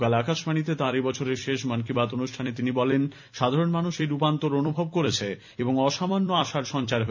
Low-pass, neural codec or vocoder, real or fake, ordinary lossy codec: 7.2 kHz; vocoder, 44.1 kHz, 128 mel bands every 256 samples, BigVGAN v2; fake; none